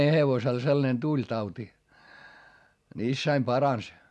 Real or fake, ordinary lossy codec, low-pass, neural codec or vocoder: real; none; none; none